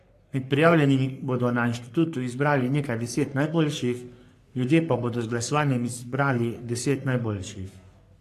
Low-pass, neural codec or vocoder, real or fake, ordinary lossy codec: 14.4 kHz; codec, 44.1 kHz, 3.4 kbps, Pupu-Codec; fake; AAC, 64 kbps